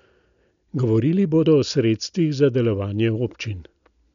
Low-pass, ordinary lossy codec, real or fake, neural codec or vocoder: 7.2 kHz; none; real; none